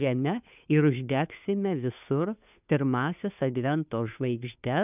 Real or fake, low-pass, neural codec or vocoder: fake; 3.6 kHz; codec, 16 kHz, 2 kbps, FunCodec, trained on Chinese and English, 25 frames a second